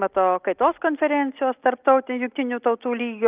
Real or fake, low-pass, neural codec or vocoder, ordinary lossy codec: real; 3.6 kHz; none; Opus, 32 kbps